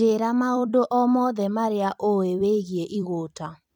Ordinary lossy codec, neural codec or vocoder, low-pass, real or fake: none; vocoder, 44.1 kHz, 128 mel bands every 256 samples, BigVGAN v2; 19.8 kHz; fake